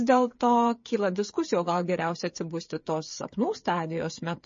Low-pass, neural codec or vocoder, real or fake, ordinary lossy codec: 7.2 kHz; codec, 16 kHz, 8 kbps, FreqCodec, smaller model; fake; MP3, 32 kbps